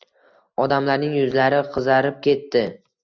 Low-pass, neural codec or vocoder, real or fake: 7.2 kHz; none; real